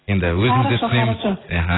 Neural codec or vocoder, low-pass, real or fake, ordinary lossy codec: none; 7.2 kHz; real; AAC, 16 kbps